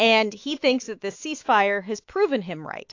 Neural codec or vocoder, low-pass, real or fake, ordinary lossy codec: autoencoder, 48 kHz, 128 numbers a frame, DAC-VAE, trained on Japanese speech; 7.2 kHz; fake; AAC, 48 kbps